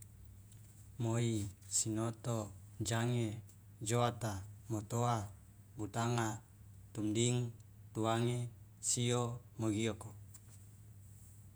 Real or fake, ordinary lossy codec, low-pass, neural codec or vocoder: fake; none; none; vocoder, 48 kHz, 128 mel bands, Vocos